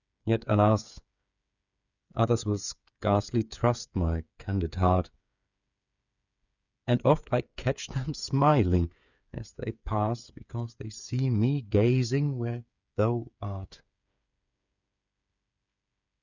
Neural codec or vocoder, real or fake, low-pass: codec, 16 kHz, 8 kbps, FreqCodec, smaller model; fake; 7.2 kHz